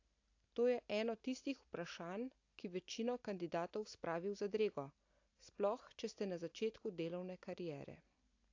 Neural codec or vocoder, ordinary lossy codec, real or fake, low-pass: none; AAC, 48 kbps; real; 7.2 kHz